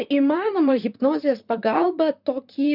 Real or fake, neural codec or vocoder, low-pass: fake; vocoder, 44.1 kHz, 128 mel bands, Pupu-Vocoder; 5.4 kHz